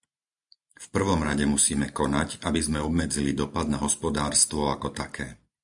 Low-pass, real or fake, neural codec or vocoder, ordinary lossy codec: 10.8 kHz; real; none; MP3, 96 kbps